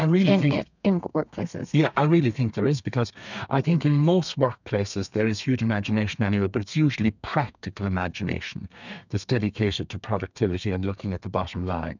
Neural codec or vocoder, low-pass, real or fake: codec, 32 kHz, 1.9 kbps, SNAC; 7.2 kHz; fake